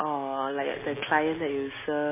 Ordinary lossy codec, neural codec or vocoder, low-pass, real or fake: MP3, 16 kbps; none; 3.6 kHz; real